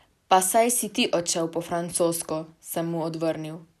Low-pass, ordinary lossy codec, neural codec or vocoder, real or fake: 14.4 kHz; none; none; real